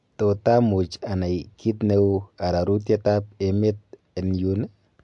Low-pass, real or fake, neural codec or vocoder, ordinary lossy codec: 10.8 kHz; real; none; MP3, 64 kbps